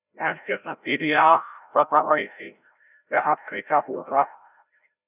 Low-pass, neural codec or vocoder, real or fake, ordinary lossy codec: 3.6 kHz; codec, 16 kHz, 0.5 kbps, FreqCodec, larger model; fake; none